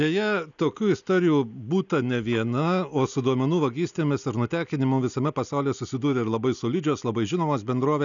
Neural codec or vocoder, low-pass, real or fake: none; 7.2 kHz; real